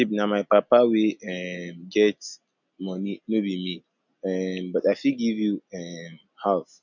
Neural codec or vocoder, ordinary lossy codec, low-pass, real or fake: none; none; 7.2 kHz; real